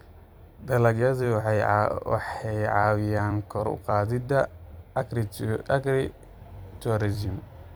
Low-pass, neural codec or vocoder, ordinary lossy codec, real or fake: none; vocoder, 44.1 kHz, 128 mel bands every 256 samples, BigVGAN v2; none; fake